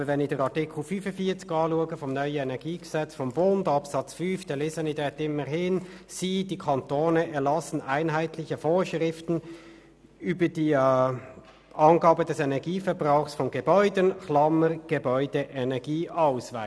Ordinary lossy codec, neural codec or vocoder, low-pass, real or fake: none; none; none; real